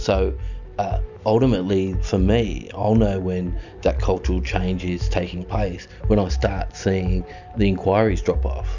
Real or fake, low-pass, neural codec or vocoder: real; 7.2 kHz; none